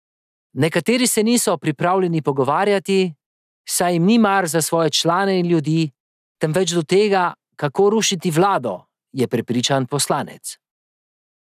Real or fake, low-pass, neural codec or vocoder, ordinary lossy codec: real; 14.4 kHz; none; none